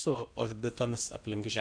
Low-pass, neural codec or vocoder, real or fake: 9.9 kHz; codec, 16 kHz in and 24 kHz out, 0.8 kbps, FocalCodec, streaming, 65536 codes; fake